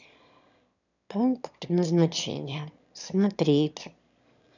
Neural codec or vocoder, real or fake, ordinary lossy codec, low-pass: autoencoder, 22.05 kHz, a latent of 192 numbers a frame, VITS, trained on one speaker; fake; none; 7.2 kHz